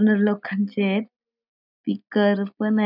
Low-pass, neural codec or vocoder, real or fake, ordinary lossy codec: 5.4 kHz; none; real; none